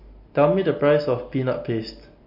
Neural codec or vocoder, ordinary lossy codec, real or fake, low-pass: none; MP3, 32 kbps; real; 5.4 kHz